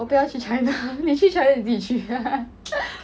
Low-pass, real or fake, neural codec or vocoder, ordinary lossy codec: none; real; none; none